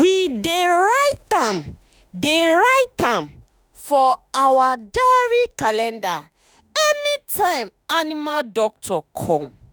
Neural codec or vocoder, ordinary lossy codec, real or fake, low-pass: autoencoder, 48 kHz, 32 numbers a frame, DAC-VAE, trained on Japanese speech; none; fake; none